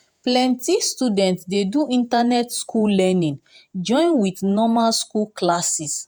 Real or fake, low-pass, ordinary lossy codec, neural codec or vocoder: fake; none; none; vocoder, 48 kHz, 128 mel bands, Vocos